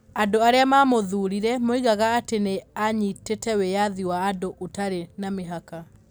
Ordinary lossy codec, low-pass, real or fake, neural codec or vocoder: none; none; real; none